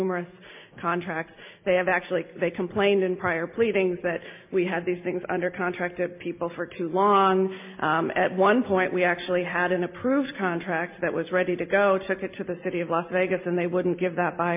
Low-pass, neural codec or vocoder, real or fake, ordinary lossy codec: 3.6 kHz; none; real; MP3, 32 kbps